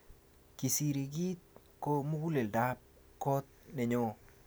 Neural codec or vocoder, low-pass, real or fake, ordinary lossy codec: none; none; real; none